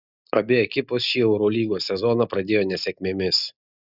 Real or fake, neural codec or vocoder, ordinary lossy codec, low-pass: real; none; Opus, 64 kbps; 5.4 kHz